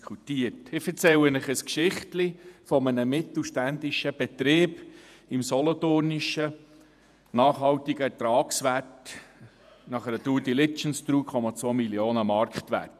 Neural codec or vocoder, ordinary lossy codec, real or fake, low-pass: vocoder, 48 kHz, 128 mel bands, Vocos; none; fake; 14.4 kHz